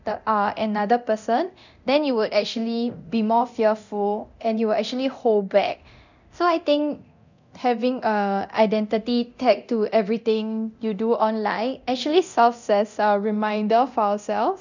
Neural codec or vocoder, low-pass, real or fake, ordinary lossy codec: codec, 24 kHz, 0.9 kbps, DualCodec; 7.2 kHz; fake; none